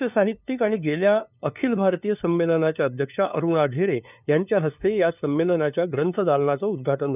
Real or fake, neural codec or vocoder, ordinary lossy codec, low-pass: fake; codec, 16 kHz, 2 kbps, FunCodec, trained on LibriTTS, 25 frames a second; none; 3.6 kHz